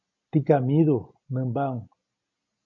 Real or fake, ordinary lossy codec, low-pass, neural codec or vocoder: real; AAC, 64 kbps; 7.2 kHz; none